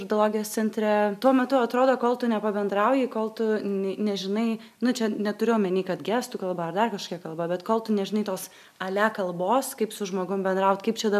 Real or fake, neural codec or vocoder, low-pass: real; none; 14.4 kHz